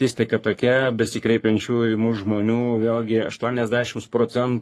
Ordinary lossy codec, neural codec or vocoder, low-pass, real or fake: AAC, 48 kbps; codec, 44.1 kHz, 3.4 kbps, Pupu-Codec; 14.4 kHz; fake